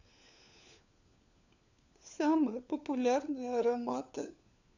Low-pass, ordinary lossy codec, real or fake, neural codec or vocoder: 7.2 kHz; none; fake; codec, 16 kHz, 4 kbps, FunCodec, trained on LibriTTS, 50 frames a second